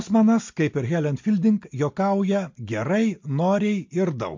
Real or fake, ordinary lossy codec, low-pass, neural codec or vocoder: real; MP3, 48 kbps; 7.2 kHz; none